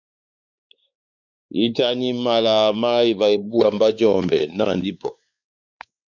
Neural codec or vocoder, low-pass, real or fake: codec, 16 kHz, 4 kbps, X-Codec, WavLM features, trained on Multilingual LibriSpeech; 7.2 kHz; fake